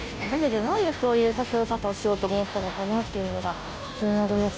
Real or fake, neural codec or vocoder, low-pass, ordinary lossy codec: fake; codec, 16 kHz, 0.5 kbps, FunCodec, trained on Chinese and English, 25 frames a second; none; none